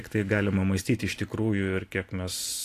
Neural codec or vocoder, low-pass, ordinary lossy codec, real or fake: none; 14.4 kHz; AAC, 64 kbps; real